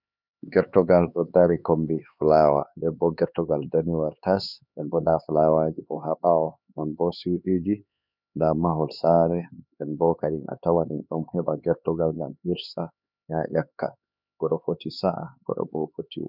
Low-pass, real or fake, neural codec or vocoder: 5.4 kHz; fake; codec, 16 kHz, 4 kbps, X-Codec, HuBERT features, trained on LibriSpeech